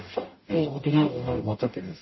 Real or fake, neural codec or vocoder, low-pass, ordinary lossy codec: fake; codec, 44.1 kHz, 0.9 kbps, DAC; 7.2 kHz; MP3, 24 kbps